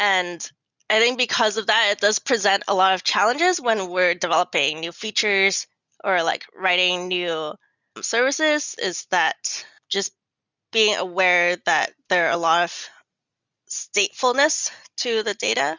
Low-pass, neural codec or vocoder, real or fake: 7.2 kHz; none; real